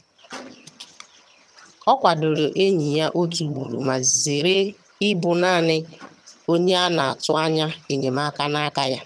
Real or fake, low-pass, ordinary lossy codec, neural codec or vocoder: fake; none; none; vocoder, 22.05 kHz, 80 mel bands, HiFi-GAN